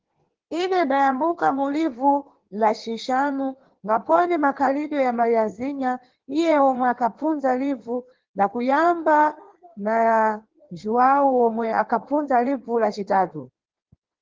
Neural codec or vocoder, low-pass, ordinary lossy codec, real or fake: codec, 16 kHz in and 24 kHz out, 1.1 kbps, FireRedTTS-2 codec; 7.2 kHz; Opus, 16 kbps; fake